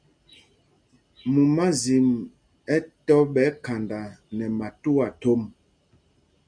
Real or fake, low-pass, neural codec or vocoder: real; 9.9 kHz; none